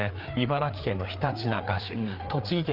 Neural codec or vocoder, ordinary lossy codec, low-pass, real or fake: codec, 16 kHz, 4 kbps, FreqCodec, larger model; Opus, 32 kbps; 5.4 kHz; fake